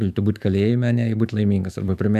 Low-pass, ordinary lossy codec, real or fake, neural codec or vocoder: 14.4 kHz; AAC, 96 kbps; fake; autoencoder, 48 kHz, 128 numbers a frame, DAC-VAE, trained on Japanese speech